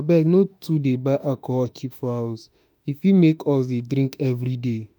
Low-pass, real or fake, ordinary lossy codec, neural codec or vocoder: none; fake; none; autoencoder, 48 kHz, 32 numbers a frame, DAC-VAE, trained on Japanese speech